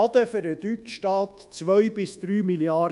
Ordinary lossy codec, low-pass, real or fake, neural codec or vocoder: none; 10.8 kHz; fake; codec, 24 kHz, 1.2 kbps, DualCodec